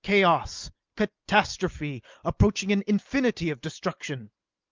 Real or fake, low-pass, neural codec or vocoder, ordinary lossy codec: real; 7.2 kHz; none; Opus, 24 kbps